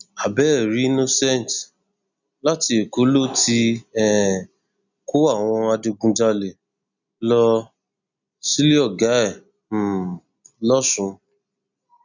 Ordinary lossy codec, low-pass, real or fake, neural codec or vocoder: none; 7.2 kHz; real; none